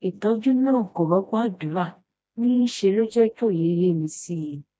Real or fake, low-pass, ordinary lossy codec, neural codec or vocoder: fake; none; none; codec, 16 kHz, 1 kbps, FreqCodec, smaller model